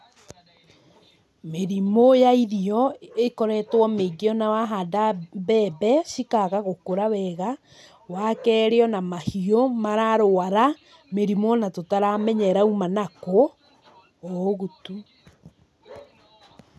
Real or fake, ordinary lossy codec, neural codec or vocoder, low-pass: real; none; none; none